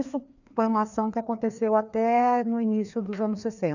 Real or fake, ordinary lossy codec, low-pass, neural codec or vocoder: fake; none; 7.2 kHz; codec, 16 kHz, 2 kbps, FreqCodec, larger model